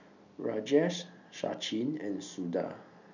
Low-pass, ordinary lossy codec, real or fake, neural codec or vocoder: 7.2 kHz; none; real; none